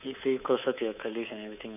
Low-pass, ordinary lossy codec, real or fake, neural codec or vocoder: 3.6 kHz; none; fake; codec, 24 kHz, 3.1 kbps, DualCodec